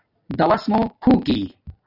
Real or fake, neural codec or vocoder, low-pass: real; none; 5.4 kHz